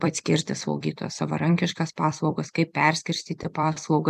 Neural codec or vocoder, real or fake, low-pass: vocoder, 44.1 kHz, 128 mel bands every 256 samples, BigVGAN v2; fake; 14.4 kHz